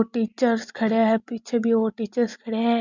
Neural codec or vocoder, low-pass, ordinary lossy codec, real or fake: none; 7.2 kHz; MP3, 64 kbps; real